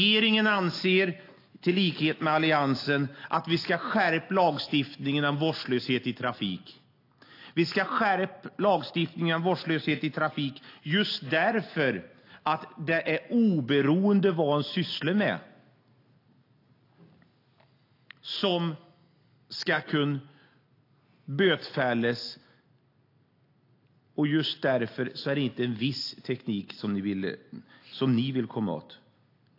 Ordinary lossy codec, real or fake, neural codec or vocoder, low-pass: AAC, 32 kbps; real; none; 5.4 kHz